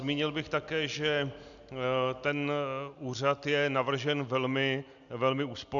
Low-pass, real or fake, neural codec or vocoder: 7.2 kHz; real; none